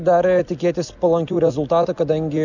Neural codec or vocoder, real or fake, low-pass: none; real; 7.2 kHz